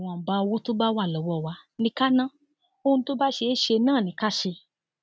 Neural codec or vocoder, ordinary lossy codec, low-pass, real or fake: none; none; none; real